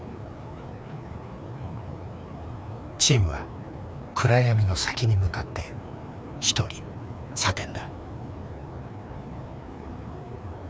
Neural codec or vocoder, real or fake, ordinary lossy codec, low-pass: codec, 16 kHz, 2 kbps, FreqCodec, larger model; fake; none; none